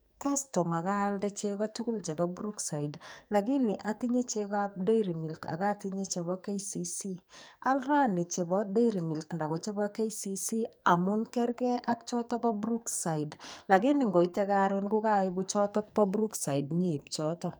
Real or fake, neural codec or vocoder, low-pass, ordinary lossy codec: fake; codec, 44.1 kHz, 2.6 kbps, SNAC; none; none